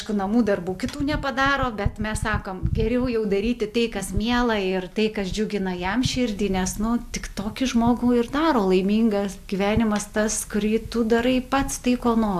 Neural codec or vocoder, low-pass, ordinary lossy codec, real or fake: none; 14.4 kHz; AAC, 96 kbps; real